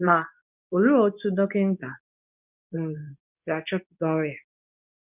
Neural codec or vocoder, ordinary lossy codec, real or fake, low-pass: codec, 24 kHz, 0.9 kbps, WavTokenizer, medium speech release version 2; none; fake; 3.6 kHz